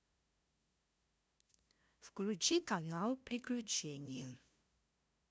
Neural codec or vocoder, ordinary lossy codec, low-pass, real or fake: codec, 16 kHz, 0.5 kbps, FunCodec, trained on LibriTTS, 25 frames a second; none; none; fake